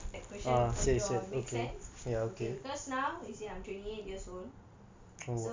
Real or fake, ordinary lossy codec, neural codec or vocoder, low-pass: real; MP3, 64 kbps; none; 7.2 kHz